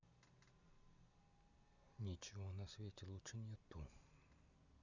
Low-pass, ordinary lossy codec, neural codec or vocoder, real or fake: 7.2 kHz; none; none; real